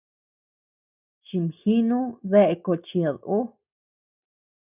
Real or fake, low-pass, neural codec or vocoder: real; 3.6 kHz; none